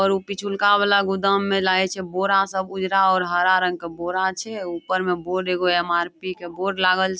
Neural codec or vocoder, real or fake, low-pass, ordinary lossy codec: none; real; none; none